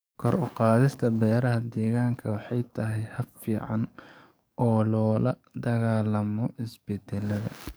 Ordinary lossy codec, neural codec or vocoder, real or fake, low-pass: none; codec, 44.1 kHz, 7.8 kbps, DAC; fake; none